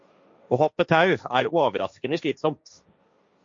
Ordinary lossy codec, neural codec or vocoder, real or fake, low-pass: MP3, 48 kbps; codec, 16 kHz, 1.1 kbps, Voila-Tokenizer; fake; 7.2 kHz